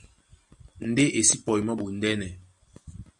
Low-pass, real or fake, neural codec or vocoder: 10.8 kHz; real; none